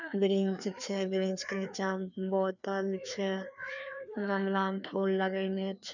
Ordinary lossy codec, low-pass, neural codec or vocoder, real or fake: none; 7.2 kHz; codec, 16 kHz, 2 kbps, FreqCodec, larger model; fake